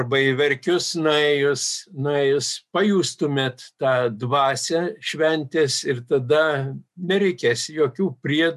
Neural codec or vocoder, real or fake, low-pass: none; real; 14.4 kHz